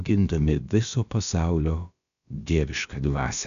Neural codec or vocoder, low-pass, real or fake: codec, 16 kHz, about 1 kbps, DyCAST, with the encoder's durations; 7.2 kHz; fake